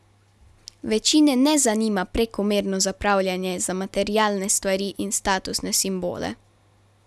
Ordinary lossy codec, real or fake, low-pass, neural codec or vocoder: none; real; none; none